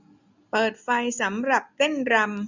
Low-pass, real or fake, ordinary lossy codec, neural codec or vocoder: 7.2 kHz; real; none; none